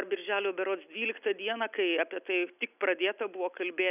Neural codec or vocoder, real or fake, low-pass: none; real; 3.6 kHz